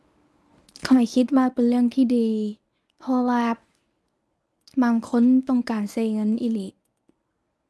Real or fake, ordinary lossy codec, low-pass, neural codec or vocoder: fake; none; none; codec, 24 kHz, 0.9 kbps, WavTokenizer, medium speech release version 1